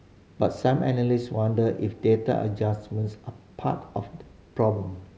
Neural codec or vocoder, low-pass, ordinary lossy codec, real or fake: none; none; none; real